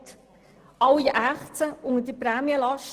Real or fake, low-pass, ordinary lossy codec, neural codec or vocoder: real; 14.4 kHz; Opus, 16 kbps; none